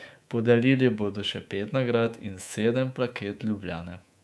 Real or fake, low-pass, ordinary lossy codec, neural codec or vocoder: fake; none; none; codec, 24 kHz, 3.1 kbps, DualCodec